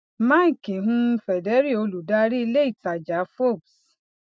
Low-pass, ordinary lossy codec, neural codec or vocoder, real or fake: none; none; none; real